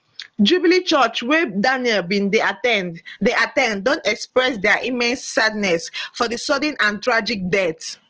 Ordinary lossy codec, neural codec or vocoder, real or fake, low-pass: Opus, 16 kbps; none; real; 7.2 kHz